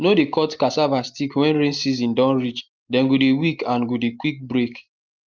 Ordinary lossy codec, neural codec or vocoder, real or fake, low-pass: Opus, 24 kbps; none; real; 7.2 kHz